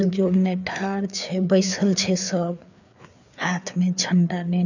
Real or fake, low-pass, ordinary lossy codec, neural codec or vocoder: fake; 7.2 kHz; none; codec, 16 kHz, 4 kbps, FreqCodec, larger model